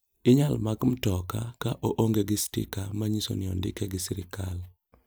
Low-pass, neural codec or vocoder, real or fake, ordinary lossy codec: none; none; real; none